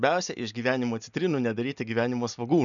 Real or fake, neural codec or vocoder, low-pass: real; none; 7.2 kHz